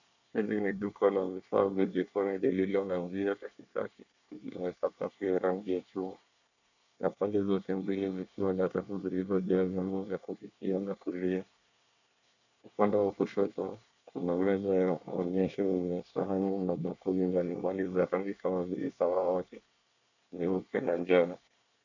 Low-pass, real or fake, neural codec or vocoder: 7.2 kHz; fake; codec, 24 kHz, 1 kbps, SNAC